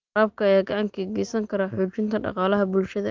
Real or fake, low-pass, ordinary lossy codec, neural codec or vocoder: real; 7.2 kHz; Opus, 24 kbps; none